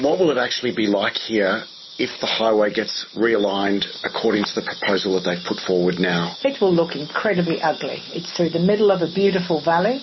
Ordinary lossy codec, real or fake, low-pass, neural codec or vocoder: MP3, 24 kbps; real; 7.2 kHz; none